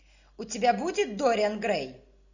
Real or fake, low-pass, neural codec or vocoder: real; 7.2 kHz; none